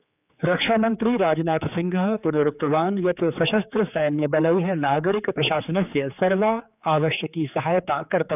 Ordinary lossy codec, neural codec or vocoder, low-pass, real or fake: none; codec, 16 kHz, 4 kbps, X-Codec, HuBERT features, trained on general audio; 3.6 kHz; fake